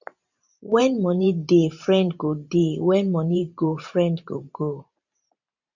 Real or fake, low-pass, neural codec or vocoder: fake; 7.2 kHz; vocoder, 44.1 kHz, 128 mel bands every 512 samples, BigVGAN v2